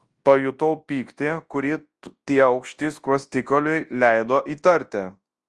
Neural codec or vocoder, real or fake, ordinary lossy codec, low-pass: codec, 24 kHz, 0.9 kbps, WavTokenizer, large speech release; fake; Opus, 24 kbps; 10.8 kHz